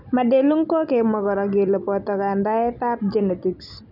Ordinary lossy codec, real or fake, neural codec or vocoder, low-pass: none; real; none; 5.4 kHz